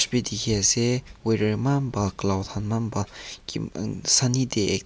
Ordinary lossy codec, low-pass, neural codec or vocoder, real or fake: none; none; none; real